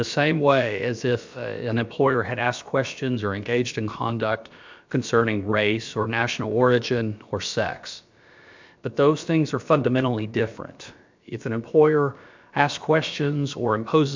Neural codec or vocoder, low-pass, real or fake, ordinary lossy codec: codec, 16 kHz, about 1 kbps, DyCAST, with the encoder's durations; 7.2 kHz; fake; MP3, 64 kbps